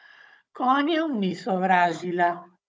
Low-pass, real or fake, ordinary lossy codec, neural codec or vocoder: none; fake; none; codec, 16 kHz, 16 kbps, FunCodec, trained on Chinese and English, 50 frames a second